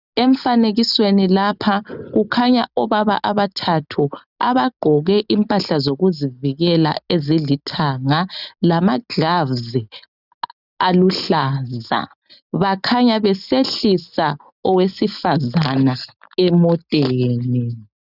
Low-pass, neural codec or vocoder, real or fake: 5.4 kHz; none; real